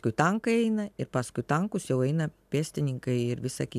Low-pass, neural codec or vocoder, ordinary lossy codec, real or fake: 14.4 kHz; none; AAC, 96 kbps; real